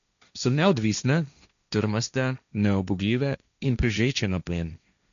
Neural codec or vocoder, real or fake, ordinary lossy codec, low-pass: codec, 16 kHz, 1.1 kbps, Voila-Tokenizer; fake; none; 7.2 kHz